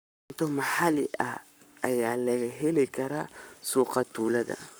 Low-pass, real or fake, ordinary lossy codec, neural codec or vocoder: none; fake; none; codec, 44.1 kHz, 7.8 kbps, Pupu-Codec